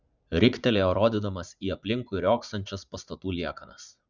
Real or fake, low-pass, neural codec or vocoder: fake; 7.2 kHz; vocoder, 44.1 kHz, 80 mel bands, Vocos